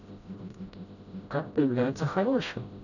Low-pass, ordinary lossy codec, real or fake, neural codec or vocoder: 7.2 kHz; none; fake; codec, 16 kHz, 0.5 kbps, FreqCodec, smaller model